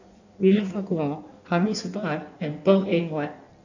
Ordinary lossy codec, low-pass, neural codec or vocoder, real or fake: none; 7.2 kHz; codec, 16 kHz in and 24 kHz out, 1.1 kbps, FireRedTTS-2 codec; fake